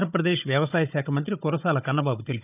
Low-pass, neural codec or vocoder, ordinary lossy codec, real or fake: 3.6 kHz; codec, 16 kHz, 16 kbps, FunCodec, trained on Chinese and English, 50 frames a second; none; fake